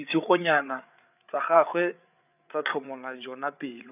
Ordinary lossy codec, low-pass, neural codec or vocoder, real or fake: none; 3.6 kHz; codec, 16 kHz, 8 kbps, FreqCodec, larger model; fake